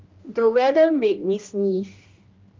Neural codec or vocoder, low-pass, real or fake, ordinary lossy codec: codec, 16 kHz, 1 kbps, X-Codec, HuBERT features, trained on general audio; 7.2 kHz; fake; Opus, 32 kbps